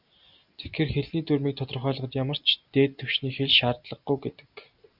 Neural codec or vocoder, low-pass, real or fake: none; 5.4 kHz; real